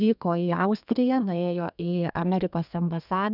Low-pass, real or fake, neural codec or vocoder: 5.4 kHz; fake; codec, 32 kHz, 1.9 kbps, SNAC